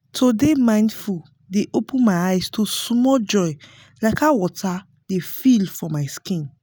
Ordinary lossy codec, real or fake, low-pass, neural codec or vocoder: none; real; none; none